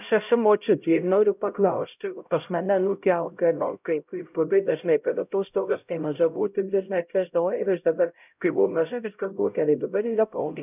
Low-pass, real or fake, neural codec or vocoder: 3.6 kHz; fake; codec, 16 kHz, 0.5 kbps, X-Codec, HuBERT features, trained on LibriSpeech